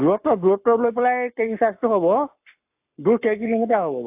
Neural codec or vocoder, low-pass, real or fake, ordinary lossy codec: codec, 44.1 kHz, 7.8 kbps, Pupu-Codec; 3.6 kHz; fake; AAC, 32 kbps